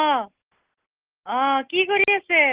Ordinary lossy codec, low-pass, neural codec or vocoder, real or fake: Opus, 16 kbps; 3.6 kHz; none; real